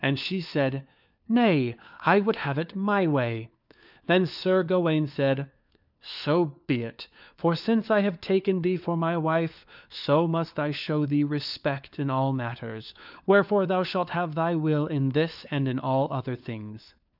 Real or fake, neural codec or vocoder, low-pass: fake; codec, 16 kHz, 4 kbps, FunCodec, trained on LibriTTS, 50 frames a second; 5.4 kHz